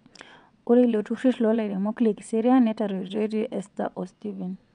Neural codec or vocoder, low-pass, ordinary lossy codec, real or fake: vocoder, 22.05 kHz, 80 mel bands, WaveNeXt; 9.9 kHz; none; fake